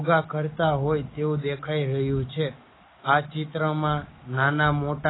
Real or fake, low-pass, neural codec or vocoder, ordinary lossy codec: real; 7.2 kHz; none; AAC, 16 kbps